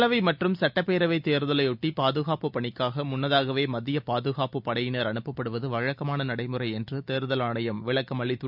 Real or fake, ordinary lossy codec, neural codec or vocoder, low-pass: real; none; none; 5.4 kHz